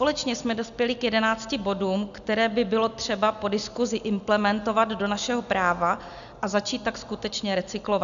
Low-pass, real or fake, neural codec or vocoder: 7.2 kHz; real; none